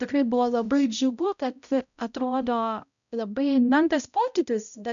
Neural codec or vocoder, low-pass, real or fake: codec, 16 kHz, 0.5 kbps, X-Codec, HuBERT features, trained on balanced general audio; 7.2 kHz; fake